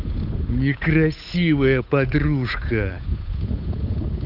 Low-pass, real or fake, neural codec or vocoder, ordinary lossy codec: 5.4 kHz; fake; codec, 16 kHz, 8 kbps, FunCodec, trained on Chinese and English, 25 frames a second; none